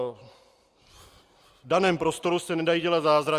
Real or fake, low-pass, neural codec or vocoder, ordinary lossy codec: real; 14.4 kHz; none; Opus, 32 kbps